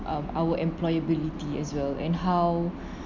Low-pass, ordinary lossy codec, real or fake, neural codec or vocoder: 7.2 kHz; none; real; none